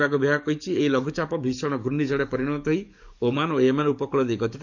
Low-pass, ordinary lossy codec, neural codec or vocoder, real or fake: 7.2 kHz; none; codec, 44.1 kHz, 7.8 kbps, DAC; fake